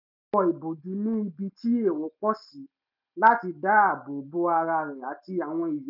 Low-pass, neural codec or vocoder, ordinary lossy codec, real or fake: 5.4 kHz; none; none; real